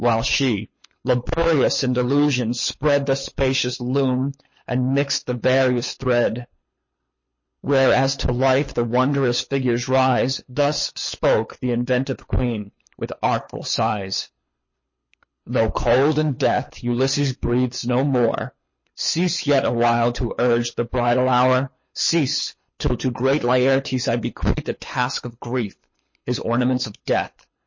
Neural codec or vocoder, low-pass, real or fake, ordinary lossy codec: codec, 24 kHz, 6 kbps, HILCodec; 7.2 kHz; fake; MP3, 32 kbps